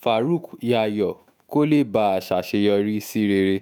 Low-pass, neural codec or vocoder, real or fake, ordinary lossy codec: none; autoencoder, 48 kHz, 128 numbers a frame, DAC-VAE, trained on Japanese speech; fake; none